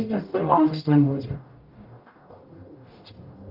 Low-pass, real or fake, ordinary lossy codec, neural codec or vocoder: 5.4 kHz; fake; Opus, 32 kbps; codec, 44.1 kHz, 0.9 kbps, DAC